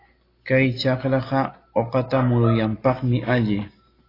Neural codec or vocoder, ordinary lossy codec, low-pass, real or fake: none; AAC, 24 kbps; 5.4 kHz; real